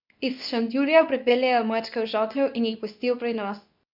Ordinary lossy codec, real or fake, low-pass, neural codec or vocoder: none; fake; 5.4 kHz; codec, 24 kHz, 0.9 kbps, WavTokenizer, medium speech release version 2